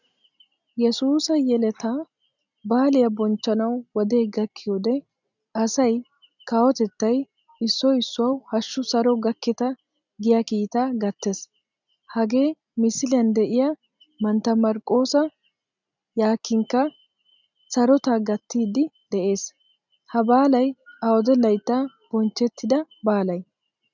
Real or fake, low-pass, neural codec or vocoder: real; 7.2 kHz; none